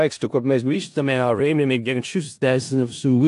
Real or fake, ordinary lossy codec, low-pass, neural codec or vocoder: fake; AAC, 64 kbps; 10.8 kHz; codec, 16 kHz in and 24 kHz out, 0.4 kbps, LongCat-Audio-Codec, four codebook decoder